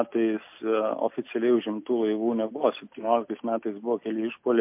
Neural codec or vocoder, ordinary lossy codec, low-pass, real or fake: none; MP3, 32 kbps; 3.6 kHz; real